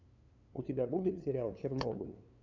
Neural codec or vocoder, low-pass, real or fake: codec, 16 kHz, 2 kbps, FunCodec, trained on LibriTTS, 25 frames a second; 7.2 kHz; fake